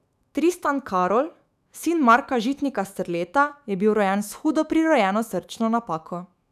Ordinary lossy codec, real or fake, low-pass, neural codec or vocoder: none; fake; 14.4 kHz; autoencoder, 48 kHz, 128 numbers a frame, DAC-VAE, trained on Japanese speech